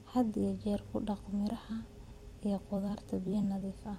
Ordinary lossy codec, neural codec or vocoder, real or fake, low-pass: MP3, 64 kbps; vocoder, 44.1 kHz, 128 mel bands every 256 samples, BigVGAN v2; fake; 19.8 kHz